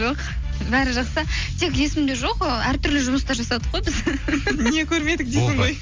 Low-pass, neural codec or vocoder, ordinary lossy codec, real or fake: 7.2 kHz; none; Opus, 32 kbps; real